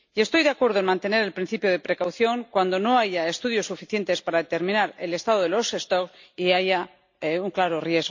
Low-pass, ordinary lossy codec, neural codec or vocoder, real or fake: 7.2 kHz; MP3, 64 kbps; none; real